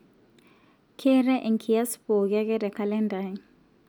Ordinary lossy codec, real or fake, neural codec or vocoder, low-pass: none; real; none; 19.8 kHz